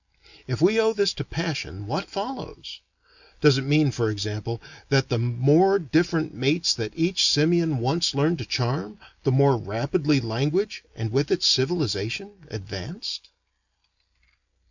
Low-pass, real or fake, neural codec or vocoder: 7.2 kHz; real; none